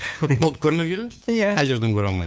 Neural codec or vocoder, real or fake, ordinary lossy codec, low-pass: codec, 16 kHz, 2 kbps, FunCodec, trained on LibriTTS, 25 frames a second; fake; none; none